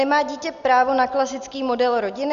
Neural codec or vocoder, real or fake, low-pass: none; real; 7.2 kHz